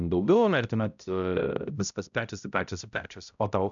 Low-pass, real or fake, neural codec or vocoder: 7.2 kHz; fake; codec, 16 kHz, 0.5 kbps, X-Codec, HuBERT features, trained on balanced general audio